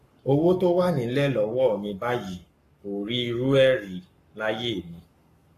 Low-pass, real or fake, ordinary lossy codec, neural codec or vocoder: 14.4 kHz; fake; AAC, 48 kbps; codec, 44.1 kHz, 7.8 kbps, Pupu-Codec